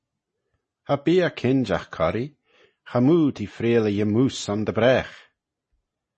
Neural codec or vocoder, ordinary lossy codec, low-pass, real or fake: none; MP3, 32 kbps; 10.8 kHz; real